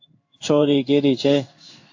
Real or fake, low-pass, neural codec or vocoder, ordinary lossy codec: fake; 7.2 kHz; codec, 16 kHz in and 24 kHz out, 1 kbps, XY-Tokenizer; AAC, 48 kbps